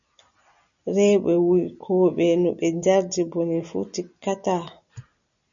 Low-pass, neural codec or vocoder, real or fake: 7.2 kHz; none; real